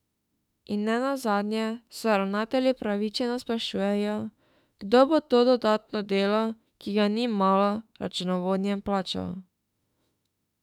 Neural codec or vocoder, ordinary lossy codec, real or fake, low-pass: autoencoder, 48 kHz, 32 numbers a frame, DAC-VAE, trained on Japanese speech; none; fake; 19.8 kHz